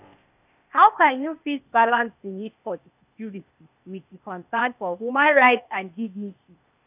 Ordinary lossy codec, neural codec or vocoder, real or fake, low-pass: none; codec, 16 kHz, 0.8 kbps, ZipCodec; fake; 3.6 kHz